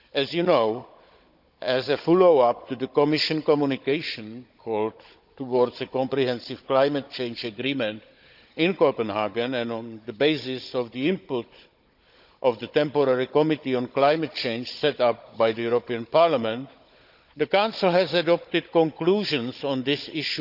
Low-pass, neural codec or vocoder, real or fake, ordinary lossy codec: 5.4 kHz; codec, 16 kHz, 16 kbps, FunCodec, trained on Chinese and English, 50 frames a second; fake; none